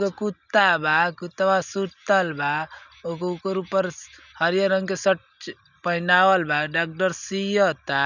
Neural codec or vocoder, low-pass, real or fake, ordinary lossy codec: none; 7.2 kHz; real; none